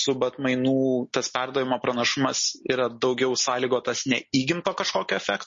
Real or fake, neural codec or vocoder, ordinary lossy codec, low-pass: real; none; MP3, 32 kbps; 7.2 kHz